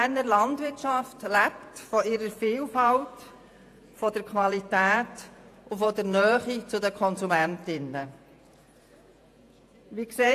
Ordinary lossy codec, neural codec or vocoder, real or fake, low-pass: AAC, 64 kbps; vocoder, 48 kHz, 128 mel bands, Vocos; fake; 14.4 kHz